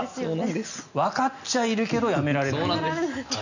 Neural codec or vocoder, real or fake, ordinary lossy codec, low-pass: none; real; none; 7.2 kHz